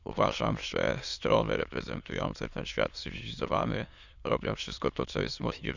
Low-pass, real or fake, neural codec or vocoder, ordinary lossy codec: 7.2 kHz; fake; autoencoder, 22.05 kHz, a latent of 192 numbers a frame, VITS, trained on many speakers; Opus, 64 kbps